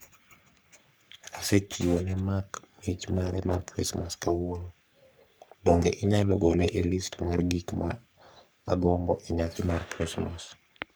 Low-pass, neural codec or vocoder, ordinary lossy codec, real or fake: none; codec, 44.1 kHz, 3.4 kbps, Pupu-Codec; none; fake